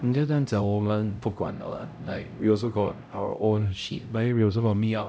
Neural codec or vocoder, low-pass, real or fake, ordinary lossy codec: codec, 16 kHz, 0.5 kbps, X-Codec, HuBERT features, trained on LibriSpeech; none; fake; none